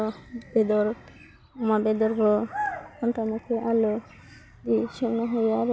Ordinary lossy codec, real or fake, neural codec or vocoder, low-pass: none; real; none; none